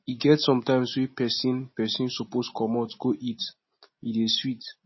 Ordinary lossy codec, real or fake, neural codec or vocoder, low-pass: MP3, 24 kbps; real; none; 7.2 kHz